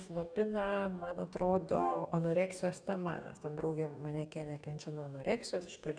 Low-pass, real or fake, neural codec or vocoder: 9.9 kHz; fake; codec, 44.1 kHz, 2.6 kbps, DAC